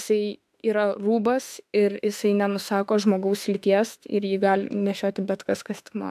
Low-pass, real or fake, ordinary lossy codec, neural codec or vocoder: 14.4 kHz; fake; AAC, 96 kbps; autoencoder, 48 kHz, 32 numbers a frame, DAC-VAE, trained on Japanese speech